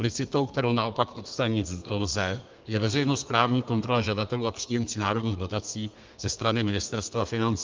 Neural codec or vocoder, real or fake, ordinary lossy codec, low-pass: codec, 32 kHz, 1.9 kbps, SNAC; fake; Opus, 24 kbps; 7.2 kHz